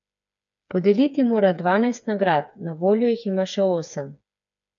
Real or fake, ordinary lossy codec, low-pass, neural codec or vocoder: fake; none; 7.2 kHz; codec, 16 kHz, 4 kbps, FreqCodec, smaller model